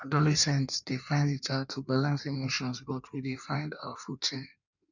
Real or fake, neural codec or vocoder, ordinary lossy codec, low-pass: fake; codec, 16 kHz in and 24 kHz out, 1.1 kbps, FireRedTTS-2 codec; none; 7.2 kHz